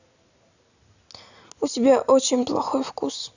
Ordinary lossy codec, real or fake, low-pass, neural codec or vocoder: none; real; 7.2 kHz; none